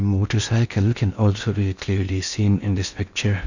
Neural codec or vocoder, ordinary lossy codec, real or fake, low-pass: codec, 16 kHz in and 24 kHz out, 0.6 kbps, FocalCodec, streaming, 4096 codes; none; fake; 7.2 kHz